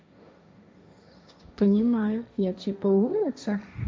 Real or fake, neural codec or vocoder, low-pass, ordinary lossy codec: fake; codec, 16 kHz, 1.1 kbps, Voila-Tokenizer; none; none